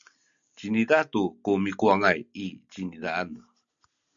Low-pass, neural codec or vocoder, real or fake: 7.2 kHz; none; real